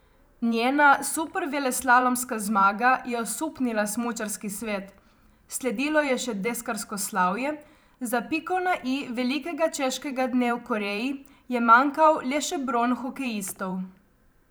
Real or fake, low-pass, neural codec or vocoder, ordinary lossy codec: fake; none; vocoder, 44.1 kHz, 128 mel bands every 512 samples, BigVGAN v2; none